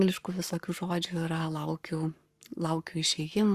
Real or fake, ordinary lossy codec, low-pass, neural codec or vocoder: fake; Opus, 64 kbps; 14.4 kHz; codec, 44.1 kHz, 7.8 kbps, Pupu-Codec